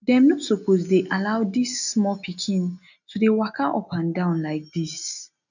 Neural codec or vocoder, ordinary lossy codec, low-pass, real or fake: none; none; 7.2 kHz; real